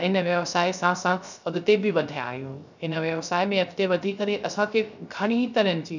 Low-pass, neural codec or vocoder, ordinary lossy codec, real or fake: 7.2 kHz; codec, 16 kHz, 0.3 kbps, FocalCodec; none; fake